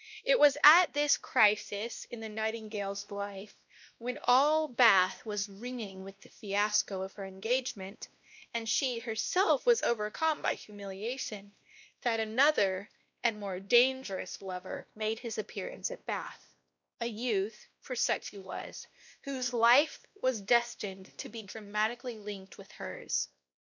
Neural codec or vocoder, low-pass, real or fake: codec, 16 kHz, 1 kbps, X-Codec, WavLM features, trained on Multilingual LibriSpeech; 7.2 kHz; fake